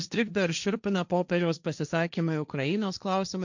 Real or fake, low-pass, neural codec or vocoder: fake; 7.2 kHz; codec, 16 kHz, 1.1 kbps, Voila-Tokenizer